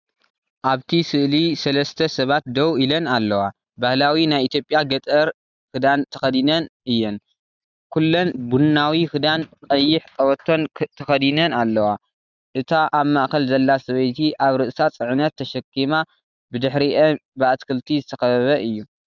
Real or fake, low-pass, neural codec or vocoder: real; 7.2 kHz; none